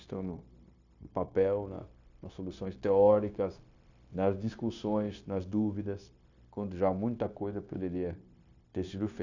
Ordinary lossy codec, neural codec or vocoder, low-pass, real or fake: none; codec, 16 kHz, 0.9 kbps, LongCat-Audio-Codec; 7.2 kHz; fake